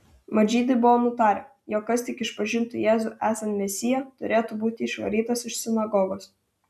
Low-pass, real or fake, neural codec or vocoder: 14.4 kHz; real; none